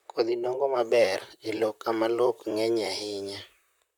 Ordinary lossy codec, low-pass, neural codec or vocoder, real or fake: none; 19.8 kHz; vocoder, 44.1 kHz, 128 mel bands, Pupu-Vocoder; fake